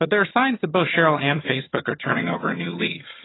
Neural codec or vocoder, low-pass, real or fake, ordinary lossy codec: vocoder, 22.05 kHz, 80 mel bands, HiFi-GAN; 7.2 kHz; fake; AAC, 16 kbps